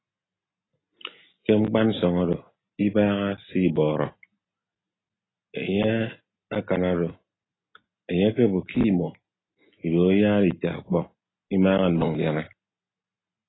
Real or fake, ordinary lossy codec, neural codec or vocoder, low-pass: real; AAC, 16 kbps; none; 7.2 kHz